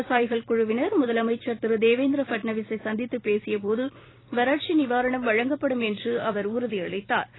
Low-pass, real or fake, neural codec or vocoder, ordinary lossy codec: 7.2 kHz; fake; vocoder, 44.1 kHz, 128 mel bands every 256 samples, BigVGAN v2; AAC, 16 kbps